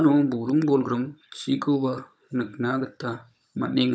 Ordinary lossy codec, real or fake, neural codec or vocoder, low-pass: none; fake; codec, 16 kHz, 16 kbps, FunCodec, trained on Chinese and English, 50 frames a second; none